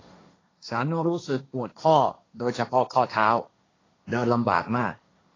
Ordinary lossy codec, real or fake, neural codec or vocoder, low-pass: AAC, 32 kbps; fake; codec, 16 kHz, 1.1 kbps, Voila-Tokenizer; 7.2 kHz